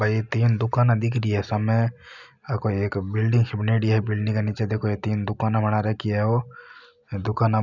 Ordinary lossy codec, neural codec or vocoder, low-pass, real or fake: none; none; 7.2 kHz; real